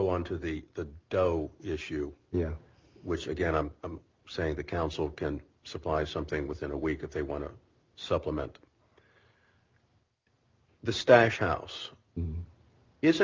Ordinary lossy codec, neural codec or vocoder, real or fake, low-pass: Opus, 24 kbps; none; real; 7.2 kHz